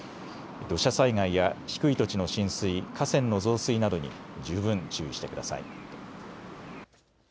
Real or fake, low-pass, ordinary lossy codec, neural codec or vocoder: real; none; none; none